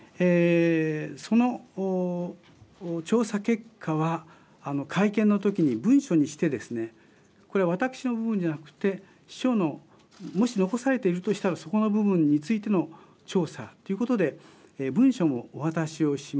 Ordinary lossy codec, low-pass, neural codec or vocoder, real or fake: none; none; none; real